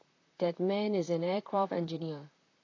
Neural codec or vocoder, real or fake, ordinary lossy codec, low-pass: vocoder, 44.1 kHz, 128 mel bands, Pupu-Vocoder; fake; AAC, 32 kbps; 7.2 kHz